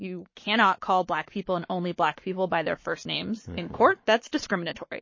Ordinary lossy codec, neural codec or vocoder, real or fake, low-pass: MP3, 32 kbps; codec, 44.1 kHz, 7.8 kbps, Pupu-Codec; fake; 7.2 kHz